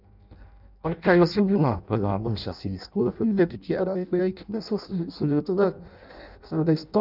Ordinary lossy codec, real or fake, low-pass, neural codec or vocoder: none; fake; 5.4 kHz; codec, 16 kHz in and 24 kHz out, 0.6 kbps, FireRedTTS-2 codec